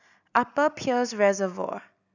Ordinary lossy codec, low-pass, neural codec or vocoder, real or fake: none; 7.2 kHz; none; real